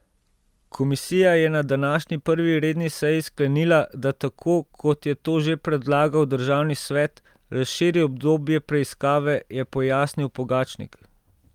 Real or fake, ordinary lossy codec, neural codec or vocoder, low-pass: real; Opus, 32 kbps; none; 19.8 kHz